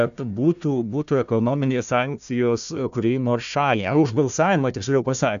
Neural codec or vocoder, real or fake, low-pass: codec, 16 kHz, 1 kbps, FunCodec, trained on Chinese and English, 50 frames a second; fake; 7.2 kHz